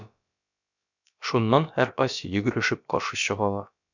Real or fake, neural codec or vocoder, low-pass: fake; codec, 16 kHz, about 1 kbps, DyCAST, with the encoder's durations; 7.2 kHz